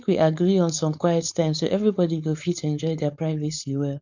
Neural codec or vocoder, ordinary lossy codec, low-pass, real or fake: codec, 16 kHz, 4.8 kbps, FACodec; none; 7.2 kHz; fake